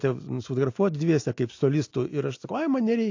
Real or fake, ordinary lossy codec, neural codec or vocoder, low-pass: real; AAC, 48 kbps; none; 7.2 kHz